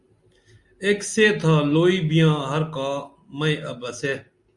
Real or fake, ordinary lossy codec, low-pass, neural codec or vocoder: real; Opus, 64 kbps; 10.8 kHz; none